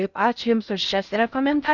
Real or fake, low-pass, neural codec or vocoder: fake; 7.2 kHz; codec, 16 kHz in and 24 kHz out, 0.6 kbps, FocalCodec, streaming, 4096 codes